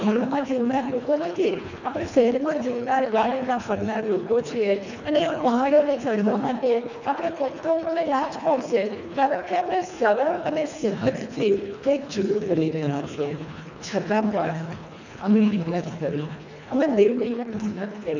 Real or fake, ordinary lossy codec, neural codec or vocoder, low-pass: fake; none; codec, 24 kHz, 1.5 kbps, HILCodec; 7.2 kHz